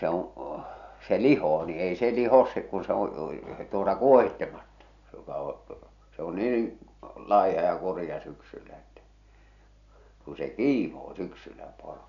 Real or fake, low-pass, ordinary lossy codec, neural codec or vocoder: real; 7.2 kHz; none; none